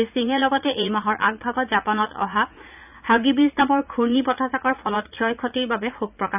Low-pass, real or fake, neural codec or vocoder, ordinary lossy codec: 3.6 kHz; fake; vocoder, 44.1 kHz, 80 mel bands, Vocos; none